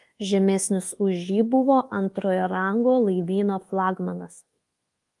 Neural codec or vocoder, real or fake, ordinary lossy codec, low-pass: codec, 24 kHz, 1.2 kbps, DualCodec; fake; Opus, 24 kbps; 10.8 kHz